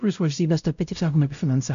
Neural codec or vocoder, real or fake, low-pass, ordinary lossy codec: codec, 16 kHz, 0.5 kbps, X-Codec, WavLM features, trained on Multilingual LibriSpeech; fake; 7.2 kHz; AAC, 96 kbps